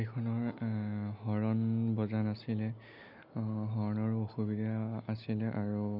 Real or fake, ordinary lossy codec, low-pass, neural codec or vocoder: real; none; 5.4 kHz; none